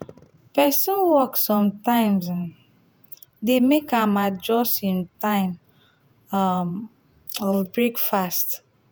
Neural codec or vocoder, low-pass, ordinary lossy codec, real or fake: vocoder, 48 kHz, 128 mel bands, Vocos; none; none; fake